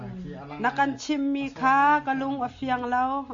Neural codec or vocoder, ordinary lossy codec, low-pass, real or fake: none; AAC, 48 kbps; 7.2 kHz; real